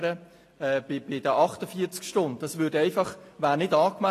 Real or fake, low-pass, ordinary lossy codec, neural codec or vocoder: real; 14.4 kHz; AAC, 48 kbps; none